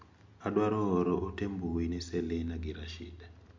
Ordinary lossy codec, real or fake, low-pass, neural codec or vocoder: MP3, 48 kbps; real; 7.2 kHz; none